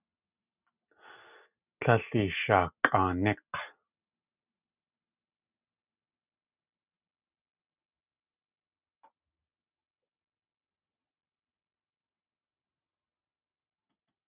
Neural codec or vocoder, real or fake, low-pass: none; real; 3.6 kHz